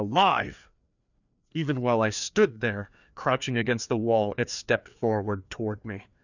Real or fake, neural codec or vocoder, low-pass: fake; codec, 16 kHz, 2 kbps, FreqCodec, larger model; 7.2 kHz